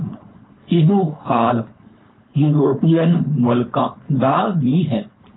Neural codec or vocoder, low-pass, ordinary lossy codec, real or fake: codec, 16 kHz, 4.8 kbps, FACodec; 7.2 kHz; AAC, 16 kbps; fake